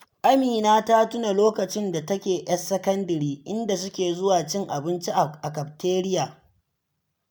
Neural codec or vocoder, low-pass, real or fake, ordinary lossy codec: none; none; real; none